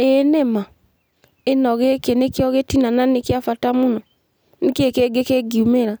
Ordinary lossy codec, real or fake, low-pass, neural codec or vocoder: none; real; none; none